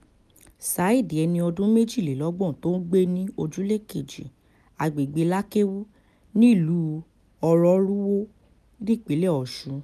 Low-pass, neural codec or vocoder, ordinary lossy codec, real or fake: 14.4 kHz; none; none; real